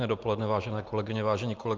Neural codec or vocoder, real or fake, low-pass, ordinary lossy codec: none; real; 7.2 kHz; Opus, 32 kbps